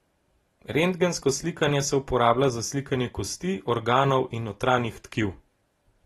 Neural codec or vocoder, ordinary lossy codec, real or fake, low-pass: none; AAC, 32 kbps; real; 19.8 kHz